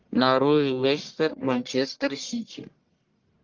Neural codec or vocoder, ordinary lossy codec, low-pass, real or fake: codec, 44.1 kHz, 1.7 kbps, Pupu-Codec; Opus, 32 kbps; 7.2 kHz; fake